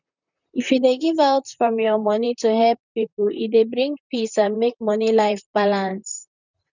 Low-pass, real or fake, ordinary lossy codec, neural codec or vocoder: 7.2 kHz; fake; none; vocoder, 44.1 kHz, 128 mel bands, Pupu-Vocoder